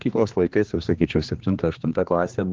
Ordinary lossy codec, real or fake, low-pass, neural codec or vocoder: Opus, 32 kbps; fake; 7.2 kHz; codec, 16 kHz, 2 kbps, X-Codec, HuBERT features, trained on general audio